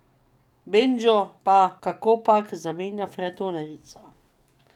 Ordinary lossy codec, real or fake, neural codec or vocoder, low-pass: none; fake; codec, 44.1 kHz, 7.8 kbps, DAC; 19.8 kHz